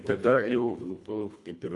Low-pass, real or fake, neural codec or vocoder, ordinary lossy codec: 10.8 kHz; fake; codec, 24 kHz, 1.5 kbps, HILCodec; Opus, 64 kbps